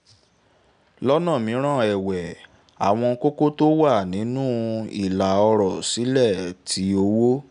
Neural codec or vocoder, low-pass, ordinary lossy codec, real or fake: none; 9.9 kHz; none; real